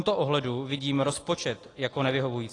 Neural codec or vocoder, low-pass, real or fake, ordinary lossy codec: none; 10.8 kHz; real; AAC, 32 kbps